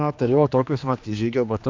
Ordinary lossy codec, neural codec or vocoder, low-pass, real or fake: MP3, 64 kbps; codec, 16 kHz, 2 kbps, X-Codec, HuBERT features, trained on general audio; 7.2 kHz; fake